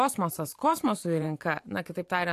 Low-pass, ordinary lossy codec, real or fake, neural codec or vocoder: 14.4 kHz; AAC, 64 kbps; fake; vocoder, 44.1 kHz, 128 mel bands every 512 samples, BigVGAN v2